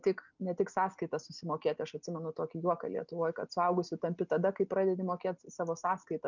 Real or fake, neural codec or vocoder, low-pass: real; none; 7.2 kHz